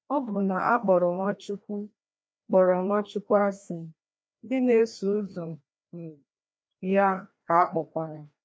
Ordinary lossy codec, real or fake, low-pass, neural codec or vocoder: none; fake; none; codec, 16 kHz, 1 kbps, FreqCodec, larger model